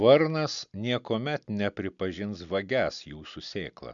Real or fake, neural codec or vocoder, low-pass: real; none; 7.2 kHz